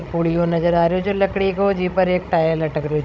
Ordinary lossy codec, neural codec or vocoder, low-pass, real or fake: none; codec, 16 kHz, 16 kbps, FreqCodec, larger model; none; fake